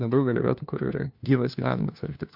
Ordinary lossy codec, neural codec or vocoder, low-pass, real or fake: MP3, 48 kbps; codec, 16 kHz, 1 kbps, FunCodec, trained on LibriTTS, 50 frames a second; 5.4 kHz; fake